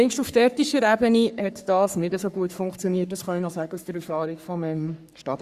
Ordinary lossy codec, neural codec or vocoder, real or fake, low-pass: Opus, 64 kbps; codec, 44.1 kHz, 3.4 kbps, Pupu-Codec; fake; 14.4 kHz